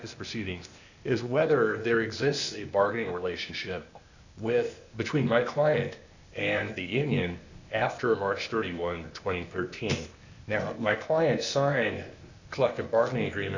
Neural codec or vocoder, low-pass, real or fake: codec, 16 kHz, 0.8 kbps, ZipCodec; 7.2 kHz; fake